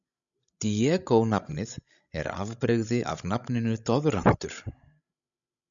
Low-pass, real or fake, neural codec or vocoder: 7.2 kHz; fake; codec, 16 kHz, 16 kbps, FreqCodec, larger model